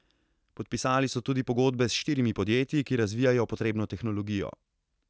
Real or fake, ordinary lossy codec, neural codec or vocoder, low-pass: real; none; none; none